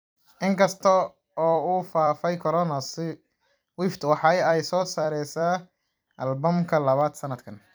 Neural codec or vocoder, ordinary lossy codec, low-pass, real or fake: vocoder, 44.1 kHz, 128 mel bands every 256 samples, BigVGAN v2; none; none; fake